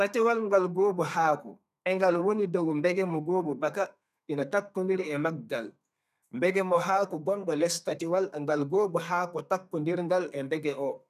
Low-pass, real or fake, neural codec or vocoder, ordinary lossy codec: 14.4 kHz; fake; codec, 32 kHz, 1.9 kbps, SNAC; none